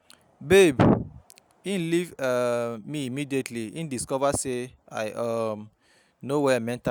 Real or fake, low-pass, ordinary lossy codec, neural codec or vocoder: real; none; none; none